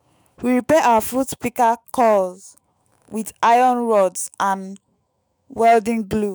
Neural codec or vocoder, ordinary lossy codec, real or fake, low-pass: autoencoder, 48 kHz, 128 numbers a frame, DAC-VAE, trained on Japanese speech; none; fake; none